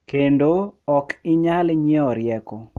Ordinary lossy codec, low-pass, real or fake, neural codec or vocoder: Opus, 24 kbps; 14.4 kHz; real; none